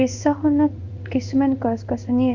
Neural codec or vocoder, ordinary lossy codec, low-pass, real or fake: codec, 16 kHz in and 24 kHz out, 1 kbps, XY-Tokenizer; none; 7.2 kHz; fake